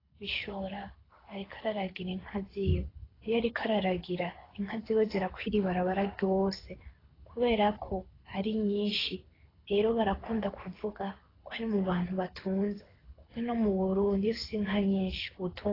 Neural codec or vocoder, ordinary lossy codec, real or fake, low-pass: codec, 24 kHz, 6 kbps, HILCodec; AAC, 24 kbps; fake; 5.4 kHz